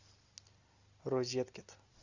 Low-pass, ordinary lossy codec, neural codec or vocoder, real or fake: 7.2 kHz; Opus, 64 kbps; none; real